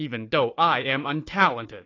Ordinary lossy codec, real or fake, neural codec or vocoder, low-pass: AAC, 32 kbps; fake; codec, 16 kHz, 4 kbps, FunCodec, trained on Chinese and English, 50 frames a second; 7.2 kHz